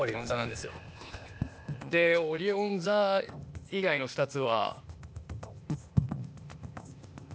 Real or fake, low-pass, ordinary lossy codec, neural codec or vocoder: fake; none; none; codec, 16 kHz, 0.8 kbps, ZipCodec